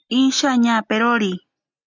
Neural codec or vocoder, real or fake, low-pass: none; real; 7.2 kHz